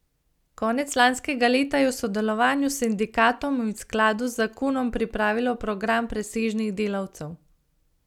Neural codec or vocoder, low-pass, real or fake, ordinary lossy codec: none; 19.8 kHz; real; none